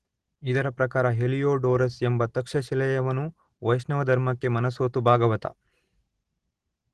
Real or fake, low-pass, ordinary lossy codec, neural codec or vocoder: real; 10.8 kHz; Opus, 16 kbps; none